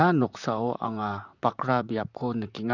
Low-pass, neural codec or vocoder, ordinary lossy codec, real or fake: 7.2 kHz; vocoder, 44.1 kHz, 128 mel bands, Pupu-Vocoder; none; fake